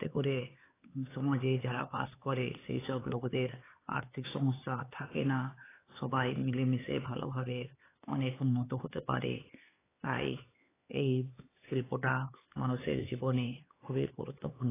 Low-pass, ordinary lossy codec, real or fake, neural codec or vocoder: 3.6 kHz; AAC, 16 kbps; fake; codec, 16 kHz, 4 kbps, X-Codec, HuBERT features, trained on LibriSpeech